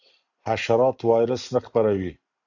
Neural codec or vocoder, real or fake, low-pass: none; real; 7.2 kHz